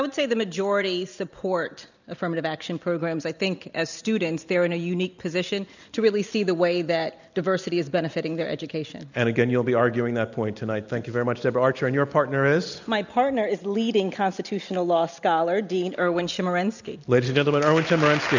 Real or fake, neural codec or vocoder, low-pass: real; none; 7.2 kHz